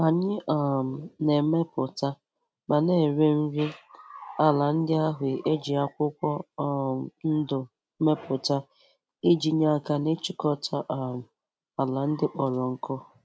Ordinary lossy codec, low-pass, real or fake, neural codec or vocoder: none; none; real; none